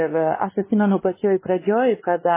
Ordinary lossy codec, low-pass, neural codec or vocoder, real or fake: MP3, 16 kbps; 3.6 kHz; codec, 16 kHz, 2 kbps, X-Codec, WavLM features, trained on Multilingual LibriSpeech; fake